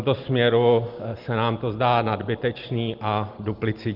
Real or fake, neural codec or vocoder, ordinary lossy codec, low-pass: real; none; Opus, 32 kbps; 5.4 kHz